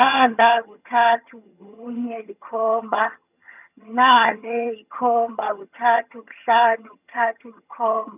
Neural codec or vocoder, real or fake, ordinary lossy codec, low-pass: vocoder, 22.05 kHz, 80 mel bands, HiFi-GAN; fake; none; 3.6 kHz